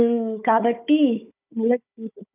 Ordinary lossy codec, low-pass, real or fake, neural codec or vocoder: none; 3.6 kHz; fake; codec, 16 kHz, 4 kbps, FunCodec, trained on Chinese and English, 50 frames a second